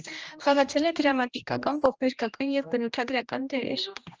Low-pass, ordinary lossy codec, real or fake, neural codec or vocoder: 7.2 kHz; Opus, 24 kbps; fake; codec, 16 kHz, 1 kbps, X-Codec, HuBERT features, trained on general audio